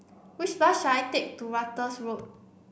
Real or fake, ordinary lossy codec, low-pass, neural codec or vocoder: real; none; none; none